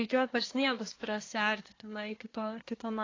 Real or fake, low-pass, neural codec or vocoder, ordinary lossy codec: fake; 7.2 kHz; codec, 24 kHz, 1 kbps, SNAC; AAC, 32 kbps